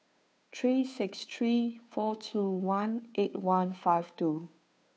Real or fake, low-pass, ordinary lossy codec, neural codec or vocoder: fake; none; none; codec, 16 kHz, 2 kbps, FunCodec, trained on Chinese and English, 25 frames a second